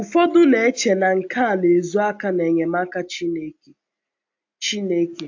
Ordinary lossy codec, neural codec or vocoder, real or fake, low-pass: AAC, 48 kbps; none; real; 7.2 kHz